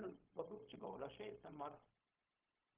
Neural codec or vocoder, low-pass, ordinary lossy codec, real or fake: codec, 16 kHz, 0.4 kbps, LongCat-Audio-Codec; 3.6 kHz; Opus, 24 kbps; fake